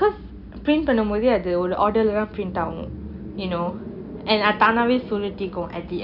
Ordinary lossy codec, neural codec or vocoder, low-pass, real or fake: none; none; 5.4 kHz; real